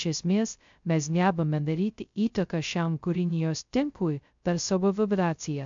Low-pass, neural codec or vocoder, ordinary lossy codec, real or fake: 7.2 kHz; codec, 16 kHz, 0.2 kbps, FocalCodec; MP3, 64 kbps; fake